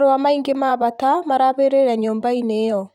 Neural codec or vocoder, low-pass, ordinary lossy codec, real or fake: vocoder, 44.1 kHz, 128 mel bands, Pupu-Vocoder; 19.8 kHz; none; fake